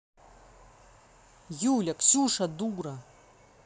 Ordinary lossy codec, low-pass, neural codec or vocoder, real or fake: none; none; none; real